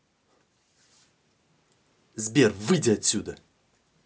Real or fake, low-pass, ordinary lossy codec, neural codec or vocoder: real; none; none; none